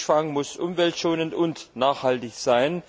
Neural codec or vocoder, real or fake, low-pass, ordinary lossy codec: none; real; none; none